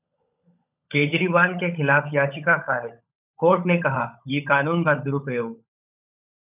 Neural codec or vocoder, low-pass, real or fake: codec, 16 kHz, 16 kbps, FunCodec, trained on LibriTTS, 50 frames a second; 3.6 kHz; fake